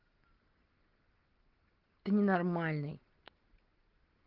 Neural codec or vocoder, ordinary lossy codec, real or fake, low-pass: none; Opus, 16 kbps; real; 5.4 kHz